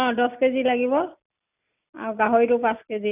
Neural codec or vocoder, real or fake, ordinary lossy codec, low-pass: none; real; none; 3.6 kHz